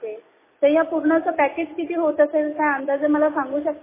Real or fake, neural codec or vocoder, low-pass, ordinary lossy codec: real; none; 3.6 kHz; MP3, 16 kbps